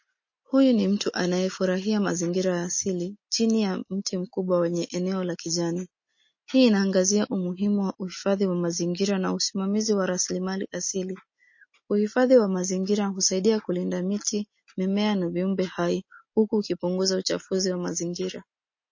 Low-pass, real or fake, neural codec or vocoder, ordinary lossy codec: 7.2 kHz; real; none; MP3, 32 kbps